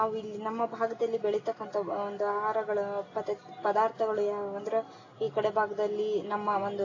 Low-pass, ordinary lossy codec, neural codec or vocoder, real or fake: 7.2 kHz; AAC, 32 kbps; none; real